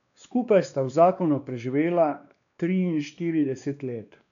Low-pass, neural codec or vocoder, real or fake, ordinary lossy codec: 7.2 kHz; codec, 16 kHz, 2 kbps, X-Codec, WavLM features, trained on Multilingual LibriSpeech; fake; none